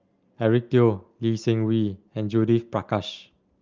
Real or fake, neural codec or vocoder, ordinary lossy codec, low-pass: real; none; Opus, 24 kbps; 7.2 kHz